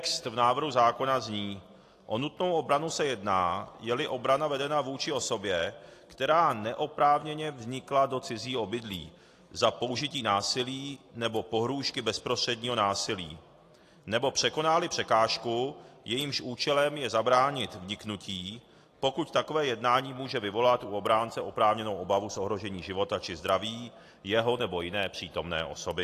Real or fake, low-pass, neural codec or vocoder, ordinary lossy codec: real; 14.4 kHz; none; AAC, 48 kbps